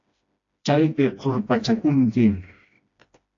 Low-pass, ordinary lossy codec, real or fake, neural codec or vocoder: 7.2 kHz; AAC, 48 kbps; fake; codec, 16 kHz, 1 kbps, FreqCodec, smaller model